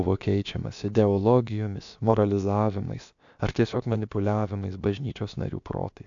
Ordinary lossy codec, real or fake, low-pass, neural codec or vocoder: AAC, 64 kbps; fake; 7.2 kHz; codec, 16 kHz, about 1 kbps, DyCAST, with the encoder's durations